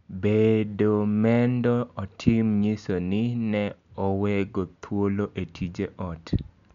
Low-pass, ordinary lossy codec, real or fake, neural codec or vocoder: 7.2 kHz; none; real; none